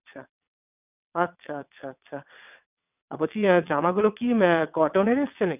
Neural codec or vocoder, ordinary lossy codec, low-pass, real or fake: vocoder, 22.05 kHz, 80 mel bands, WaveNeXt; none; 3.6 kHz; fake